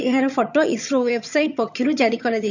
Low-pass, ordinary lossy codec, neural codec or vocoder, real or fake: 7.2 kHz; none; vocoder, 22.05 kHz, 80 mel bands, HiFi-GAN; fake